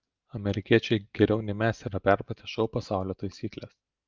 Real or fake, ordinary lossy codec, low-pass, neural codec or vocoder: real; Opus, 32 kbps; 7.2 kHz; none